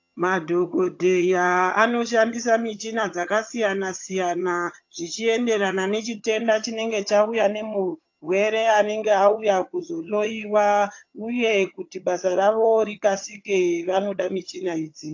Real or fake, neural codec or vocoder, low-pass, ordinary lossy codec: fake; vocoder, 22.05 kHz, 80 mel bands, HiFi-GAN; 7.2 kHz; AAC, 48 kbps